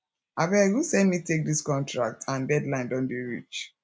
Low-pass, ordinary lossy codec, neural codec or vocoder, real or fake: none; none; none; real